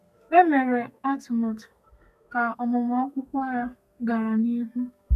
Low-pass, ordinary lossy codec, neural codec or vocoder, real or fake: 14.4 kHz; none; codec, 32 kHz, 1.9 kbps, SNAC; fake